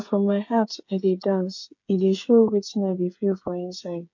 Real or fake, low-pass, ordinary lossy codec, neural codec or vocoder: fake; 7.2 kHz; MP3, 48 kbps; codec, 16 kHz, 8 kbps, FreqCodec, smaller model